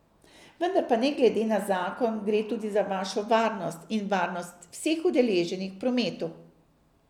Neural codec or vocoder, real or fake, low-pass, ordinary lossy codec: none; real; 19.8 kHz; none